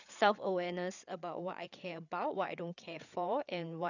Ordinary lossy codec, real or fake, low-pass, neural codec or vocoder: none; fake; 7.2 kHz; codec, 16 kHz, 8 kbps, FreqCodec, larger model